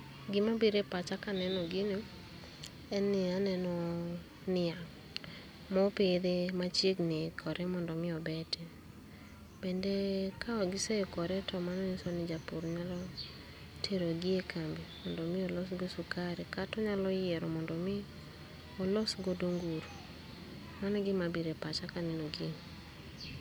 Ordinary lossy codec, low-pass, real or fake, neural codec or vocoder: none; none; real; none